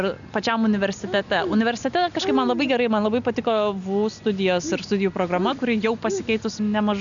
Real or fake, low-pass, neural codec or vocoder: real; 7.2 kHz; none